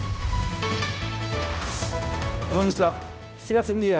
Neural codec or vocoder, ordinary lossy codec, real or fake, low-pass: codec, 16 kHz, 0.5 kbps, X-Codec, HuBERT features, trained on balanced general audio; none; fake; none